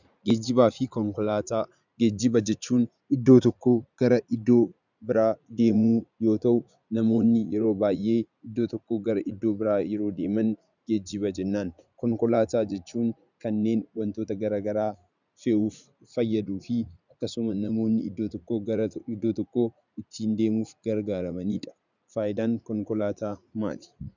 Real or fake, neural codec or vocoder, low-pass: fake; vocoder, 44.1 kHz, 80 mel bands, Vocos; 7.2 kHz